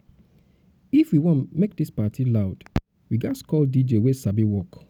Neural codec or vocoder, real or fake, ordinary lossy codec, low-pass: none; real; none; 19.8 kHz